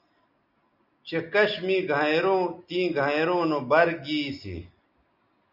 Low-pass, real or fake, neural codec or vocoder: 5.4 kHz; real; none